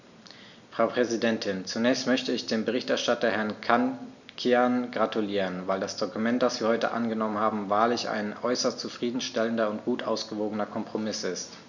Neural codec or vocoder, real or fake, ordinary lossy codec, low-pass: none; real; none; 7.2 kHz